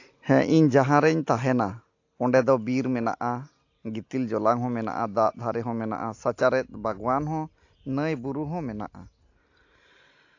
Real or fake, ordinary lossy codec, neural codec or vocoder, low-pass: real; AAC, 48 kbps; none; 7.2 kHz